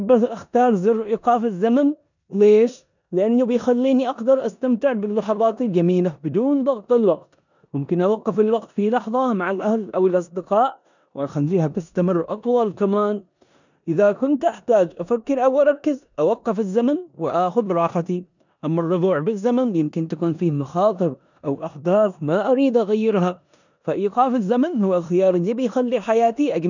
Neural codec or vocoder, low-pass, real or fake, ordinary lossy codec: codec, 16 kHz in and 24 kHz out, 0.9 kbps, LongCat-Audio-Codec, four codebook decoder; 7.2 kHz; fake; none